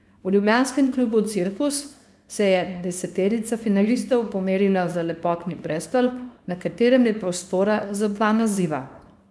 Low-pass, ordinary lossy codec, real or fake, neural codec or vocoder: none; none; fake; codec, 24 kHz, 0.9 kbps, WavTokenizer, small release